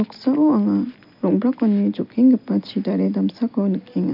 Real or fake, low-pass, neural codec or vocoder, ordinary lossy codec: real; 5.4 kHz; none; none